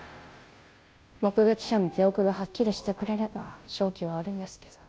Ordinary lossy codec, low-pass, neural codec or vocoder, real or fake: none; none; codec, 16 kHz, 0.5 kbps, FunCodec, trained on Chinese and English, 25 frames a second; fake